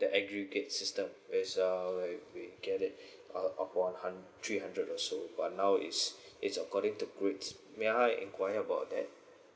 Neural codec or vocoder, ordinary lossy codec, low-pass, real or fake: none; none; none; real